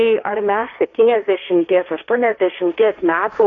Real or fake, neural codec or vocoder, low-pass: fake; codec, 16 kHz, 1.1 kbps, Voila-Tokenizer; 7.2 kHz